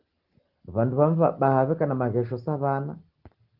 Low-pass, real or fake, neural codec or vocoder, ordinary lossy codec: 5.4 kHz; real; none; Opus, 16 kbps